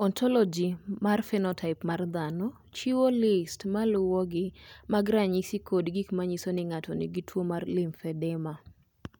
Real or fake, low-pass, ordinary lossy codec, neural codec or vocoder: real; none; none; none